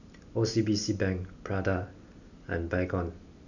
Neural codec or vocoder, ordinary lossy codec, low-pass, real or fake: none; none; 7.2 kHz; real